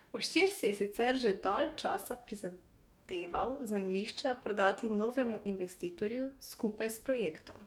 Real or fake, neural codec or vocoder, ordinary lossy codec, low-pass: fake; codec, 44.1 kHz, 2.6 kbps, DAC; none; 19.8 kHz